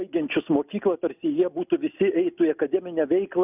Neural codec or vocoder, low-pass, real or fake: none; 3.6 kHz; real